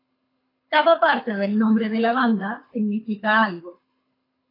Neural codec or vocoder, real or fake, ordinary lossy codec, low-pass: codec, 24 kHz, 6 kbps, HILCodec; fake; AAC, 24 kbps; 5.4 kHz